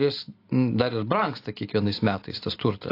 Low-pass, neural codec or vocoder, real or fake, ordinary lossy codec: 5.4 kHz; none; real; AAC, 32 kbps